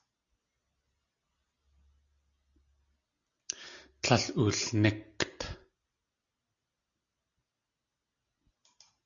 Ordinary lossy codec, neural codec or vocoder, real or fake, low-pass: Opus, 64 kbps; none; real; 7.2 kHz